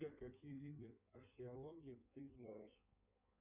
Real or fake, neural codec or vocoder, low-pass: fake; codec, 16 kHz in and 24 kHz out, 1.1 kbps, FireRedTTS-2 codec; 3.6 kHz